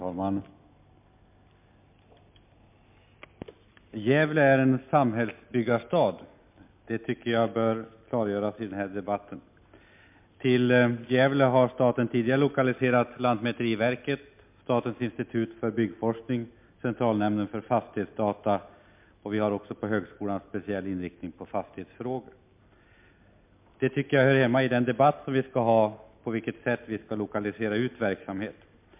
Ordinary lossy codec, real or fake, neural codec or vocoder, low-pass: MP3, 32 kbps; real; none; 3.6 kHz